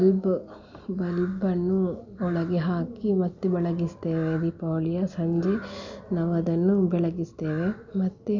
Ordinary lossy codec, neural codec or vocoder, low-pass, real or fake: none; none; 7.2 kHz; real